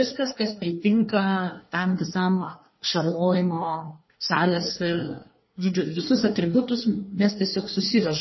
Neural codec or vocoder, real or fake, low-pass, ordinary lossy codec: codec, 24 kHz, 1 kbps, SNAC; fake; 7.2 kHz; MP3, 24 kbps